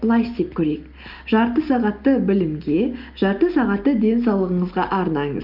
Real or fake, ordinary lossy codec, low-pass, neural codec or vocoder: real; Opus, 24 kbps; 5.4 kHz; none